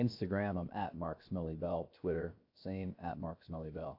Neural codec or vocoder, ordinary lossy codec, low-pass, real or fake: codec, 16 kHz, 0.7 kbps, FocalCodec; AAC, 32 kbps; 5.4 kHz; fake